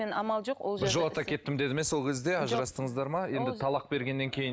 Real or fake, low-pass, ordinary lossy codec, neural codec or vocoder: real; none; none; none